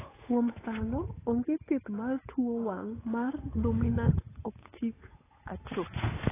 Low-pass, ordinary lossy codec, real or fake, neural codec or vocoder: 3.6 kHz; AAC, 16 kbps; fake; codec, 16 kHz, 8 kbps, FunCodec, trained on Chinese and English, 25 frames a second